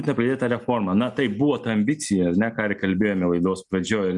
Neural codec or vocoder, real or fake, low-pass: none; real; 10.8 kHz